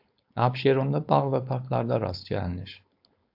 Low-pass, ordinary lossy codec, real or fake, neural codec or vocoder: 5.4 kHz; AAC, 48 kbps; fake; codec, 16 kHz, 4.8 kbps, FACodec